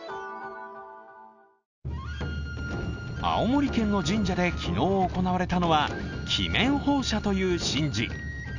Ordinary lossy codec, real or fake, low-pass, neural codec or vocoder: none; real; 7.2 kHz; none